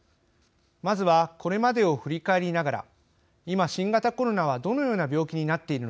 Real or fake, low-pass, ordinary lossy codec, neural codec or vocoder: real; none; none; none